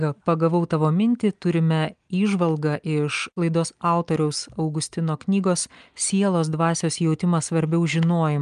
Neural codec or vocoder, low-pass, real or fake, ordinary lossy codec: none; 9.9 kHz; real; Opus, 32 kbps